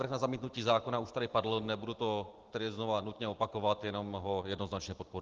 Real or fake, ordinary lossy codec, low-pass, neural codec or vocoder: real; Opus, 16 kbps; 7.2 kHz; none